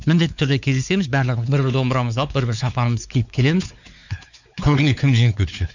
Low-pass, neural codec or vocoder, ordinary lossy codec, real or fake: 7.2 kHz; codec, 16 kHz, 4 kbps, X-Codec, WavLM features, trained on Multilingual LibriSpeech; none; fake